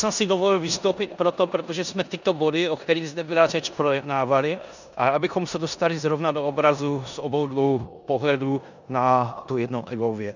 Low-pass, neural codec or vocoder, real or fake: 7.2 kHz; codec, 16 kHz in and 24 kHz out, 0.9 kbps, LongCat-Audio-Codec, four codebook decoder; fake